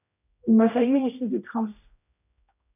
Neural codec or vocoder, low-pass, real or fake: codec, 16 kHz, 0.5 kbps, X-Codec, HuBERT features, trained on general audio; 3.6 kHz; fake